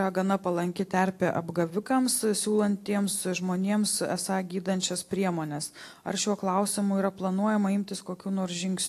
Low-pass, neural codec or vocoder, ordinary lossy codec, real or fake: 14.4 kHz; none; AAC, 64 kbps; real